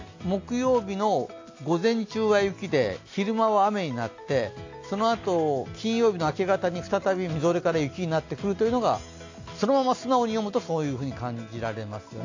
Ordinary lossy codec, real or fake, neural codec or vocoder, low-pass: AAC, 48 kbps; real; none; 7.2 kHz